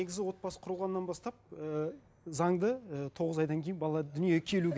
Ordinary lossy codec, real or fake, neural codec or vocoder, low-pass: none; real; none; none